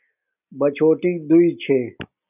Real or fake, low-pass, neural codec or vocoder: real; 3.6 kHz; none